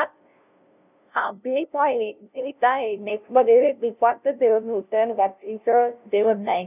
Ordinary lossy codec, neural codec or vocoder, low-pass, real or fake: none; codec, 16 kHz, 0.5 kbps, FunCodec, trained on LibriTTS, 25 frames a second; 3.6 kHz; fake